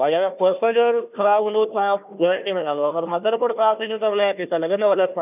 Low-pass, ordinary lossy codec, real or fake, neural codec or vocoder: 3.6 kHz; none; fake; codec, 16 kHz, 1 kbps, FunCodec, trained on Chinese and English, 50 frames a second